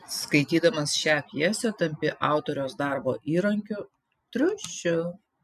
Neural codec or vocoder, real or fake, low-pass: none; real; 14.4 kHz